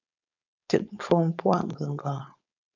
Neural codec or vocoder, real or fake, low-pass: codec, 16 kHz, 4.8 kbps, FACodec; fake; 7.2 kHz